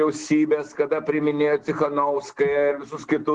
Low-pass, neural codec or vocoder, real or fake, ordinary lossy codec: 10.8 kHz; none; real; Opus, 16 kbps